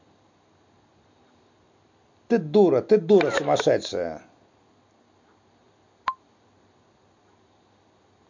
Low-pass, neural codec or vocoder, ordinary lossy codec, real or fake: 7.2 kHz; none; MP3, 48 kbps; real